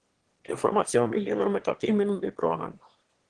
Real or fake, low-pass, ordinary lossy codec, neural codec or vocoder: fake; 9.9 kHz; Opus, 16 kbps; autoencoder, 22.05 kHz, a latent of 192 numbers a frame, VITS, trained on one speaker